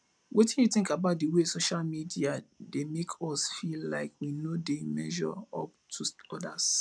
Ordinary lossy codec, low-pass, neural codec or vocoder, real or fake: none; none; none; real